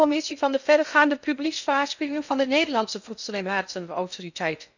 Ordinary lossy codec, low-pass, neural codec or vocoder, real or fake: none; 7.2 kHz; codec, 16 kHz in and 24 kHz out, 0.6 kbps, FocalCodec, streaming, 2048 codes; fake